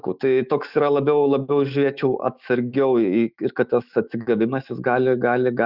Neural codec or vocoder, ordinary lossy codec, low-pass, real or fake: autoencoder, 48 kHz, 128 numbers a frame, DAC-VAE, trained on Japanese speech; Opus, 64 kbps; 5.4 kHz; fake